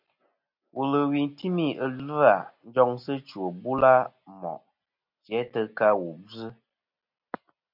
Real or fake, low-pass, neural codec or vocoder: real; 5.4 kHz; none